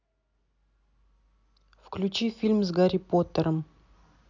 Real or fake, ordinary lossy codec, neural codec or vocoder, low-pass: real; none; none; 7.2 kHz